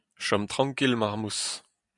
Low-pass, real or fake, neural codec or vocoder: 10.8 kHz; real; none